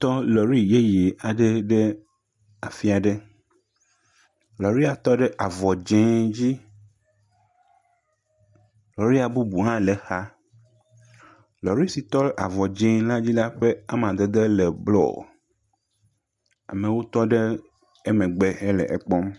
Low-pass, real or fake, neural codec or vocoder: 10.8 kHz; real; none